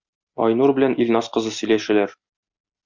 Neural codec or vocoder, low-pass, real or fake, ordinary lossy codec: none; 7.2 kHz; real; Opus, 64 kbps